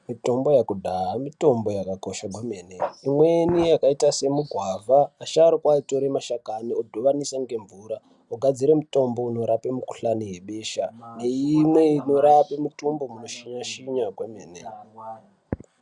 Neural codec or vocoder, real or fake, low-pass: none; real; 10.8 kHz